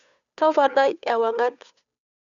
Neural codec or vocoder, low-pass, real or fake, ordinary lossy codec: codec, 16 kHz, 2 kbps, FunCodec, trained on Chinese and English, 25 frames a second; 7.2 kHz; fake; none